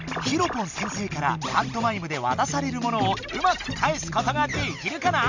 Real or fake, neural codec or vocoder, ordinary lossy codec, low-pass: real; none; Opus, 64 kbps; 7.2 kHz